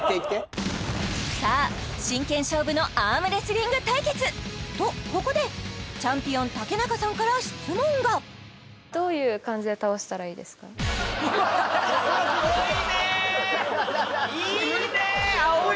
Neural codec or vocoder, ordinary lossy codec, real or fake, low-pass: none; none; real; none